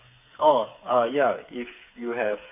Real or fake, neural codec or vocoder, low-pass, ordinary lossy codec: fake; codec, 16 kHz, 4 kbps, FreqCodec, smaller model; 3.6 kHz; MP3, 24 kbps